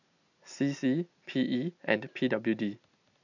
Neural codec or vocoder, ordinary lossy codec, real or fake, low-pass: none; none; real; 7.2 kHz